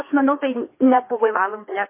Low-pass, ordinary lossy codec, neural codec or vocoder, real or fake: 3.6 kHz; MP3, 24 kbps; codec, 16 kHz, 2 kbps, FreqCodec, larger model; fake